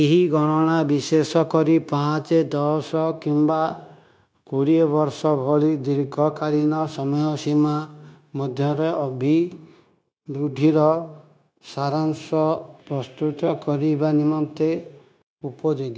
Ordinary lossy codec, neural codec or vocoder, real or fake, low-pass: none; codec, 16 kHz, 0.9 kbps, LongCat-Audio-Codec; fake; none